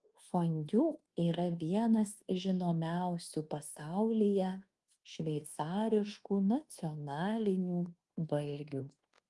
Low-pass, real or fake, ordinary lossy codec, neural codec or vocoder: 10.8 kHz; fake; Opus, 24 kbps; codec, 24 kHz, 1.2 kbps, DualCodec